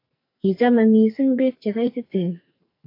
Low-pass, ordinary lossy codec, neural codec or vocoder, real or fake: 5.4 kHz; AAC, 32 kbps; codec, 44.1 kHz, 2.6 kbps, SNAC; fake